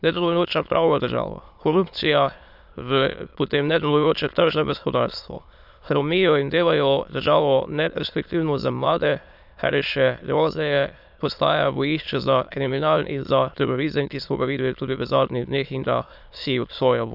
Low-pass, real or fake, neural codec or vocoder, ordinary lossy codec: 5.4 kHz; fake; autoencoder, 22.05 kHz, a latent of 192 numbers a frame, VITS, trained on many speakers; none